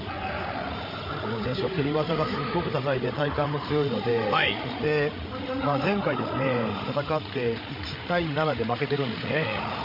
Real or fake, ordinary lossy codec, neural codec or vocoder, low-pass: fake; MP3, 32 kbps; codec, 16 kHz, 16 kbps, FreqCodec, larger model; 5.4 kHz